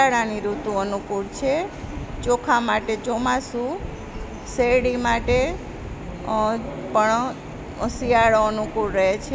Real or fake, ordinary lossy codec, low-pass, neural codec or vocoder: real; none; none; none